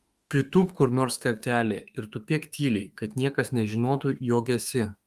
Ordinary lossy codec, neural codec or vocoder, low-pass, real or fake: Opus, 32 kbps; autoencoder, 48 kHz, 32 numbers a frame, DAC-VAE, trained on Japanese speech; 14.4 kHz; fake